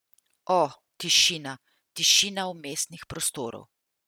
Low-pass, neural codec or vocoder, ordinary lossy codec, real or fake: none; none; none; real